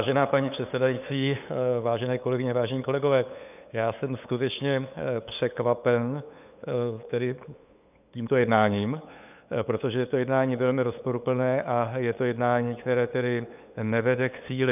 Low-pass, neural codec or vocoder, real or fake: 3.6 kHz; codec, 16 kHz, 8 kbps, FunCodec, trained on LibriTTS, 25 frames a second; fake